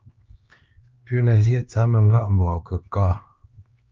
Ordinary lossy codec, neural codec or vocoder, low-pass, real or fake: Opus, 24 kbps; codec, 16 kHz, 2 kbps, X-Codec, HuBERT features, trained on LibriSpeech; 7.2 kHz; fake